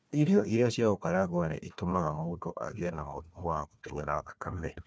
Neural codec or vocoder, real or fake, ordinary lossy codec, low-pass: codec, 16 kHz, 1 kbps, FunCodec, trained on Chinese and English, 50 frames a second; fake; none; none